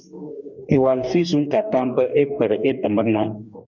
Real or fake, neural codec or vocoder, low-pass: fake; codec, 44.1 kHz, 2.6 kbps, DAC; 7.2 kHz